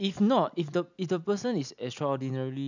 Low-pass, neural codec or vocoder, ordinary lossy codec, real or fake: 7.2 kHz; none; none; real